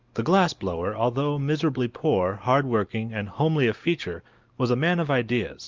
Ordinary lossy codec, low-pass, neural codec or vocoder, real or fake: Opus, 32 kbps; 7.2 kHz; none; real